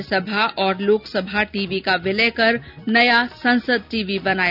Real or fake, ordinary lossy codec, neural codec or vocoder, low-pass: real; none; none; 5.4 kHz